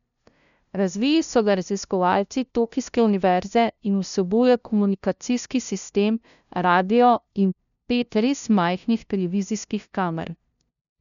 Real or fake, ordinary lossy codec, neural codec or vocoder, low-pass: fake; none; codec, 16 kHz, 0.5 kbps, FunCodec, trained on LibriTTS, 25 frames a second; 7.2 kHz